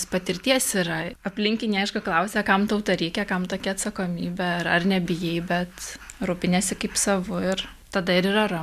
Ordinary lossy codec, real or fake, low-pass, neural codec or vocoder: MP3, 96 kbps; real; 14.4 kHz; none